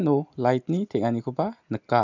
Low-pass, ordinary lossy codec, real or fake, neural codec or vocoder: 7.2 kHz; none; real; none